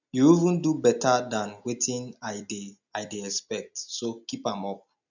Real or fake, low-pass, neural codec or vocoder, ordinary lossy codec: real; 7.2 kHz; none; none